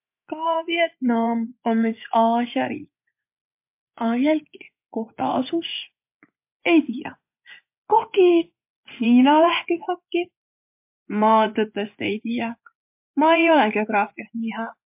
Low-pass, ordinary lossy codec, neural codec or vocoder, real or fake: 3.6 kHz; MP3, 32 kbps; vocoder, 24 kHz, 100 mel bands, Vocos; fake